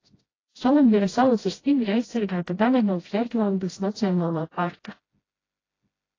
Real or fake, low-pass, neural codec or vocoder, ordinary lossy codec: fake; 7.2 kHz; codec, 16 kHz, 0.5 kbps, FreqCodec, smaller model; AAC, 32 kbps